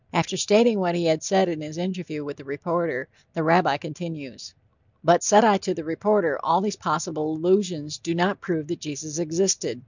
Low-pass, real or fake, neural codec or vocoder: 7.2 kHz; real; none